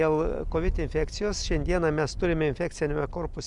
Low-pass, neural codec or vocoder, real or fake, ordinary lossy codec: 10.8 kHz; none; real; Opus, 64 kbps